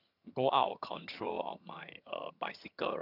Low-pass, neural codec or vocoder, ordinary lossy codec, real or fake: 5.4 kHz; vocoder, 22.05 kHz, 80 mel bands, HiFi-GAN; none; fake